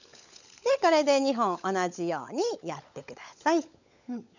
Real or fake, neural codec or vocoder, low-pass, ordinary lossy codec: fake; codec, 16 kHz, 16 kbps, FunCodec, trained on LibriTTS, 50 frames a second; 7.2 kHz; none